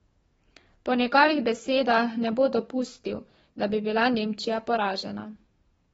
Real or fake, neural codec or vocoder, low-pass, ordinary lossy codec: fake; codec, 44.1 kHz, 7.8 kbps, DAC; 19.8 kHz; AAC, 24 kbps